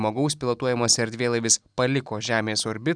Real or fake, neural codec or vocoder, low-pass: real; none; 9.9 kHz